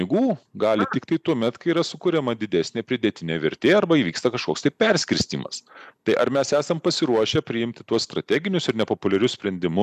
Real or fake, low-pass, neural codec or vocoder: real; 14.4 kHz; none